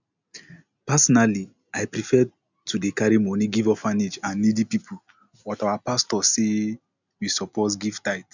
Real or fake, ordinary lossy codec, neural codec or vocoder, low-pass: real; none; none; 7.2 kHz